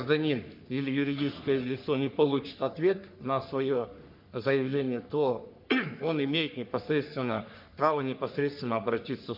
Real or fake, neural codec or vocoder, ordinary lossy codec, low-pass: fake; codec, 44.1 kHz, 3.4 kbps, Pupu-Codec; MP3, 48 kbps; 5.4 kHz